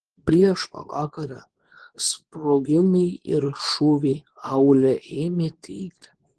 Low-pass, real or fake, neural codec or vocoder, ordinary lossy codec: 10.8 kHz; fake; codec, 24 kHz, 0.9 kbps, WavTokenizer, medium speech release version 2; Opus, 16 kbps